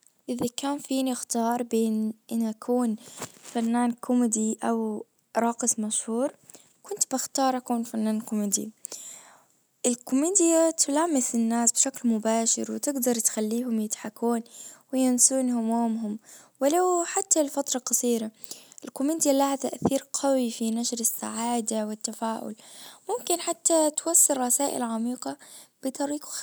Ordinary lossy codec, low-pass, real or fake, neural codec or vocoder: none; none; real; none